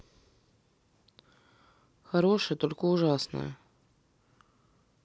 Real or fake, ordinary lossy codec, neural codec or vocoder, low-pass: real; none; none; none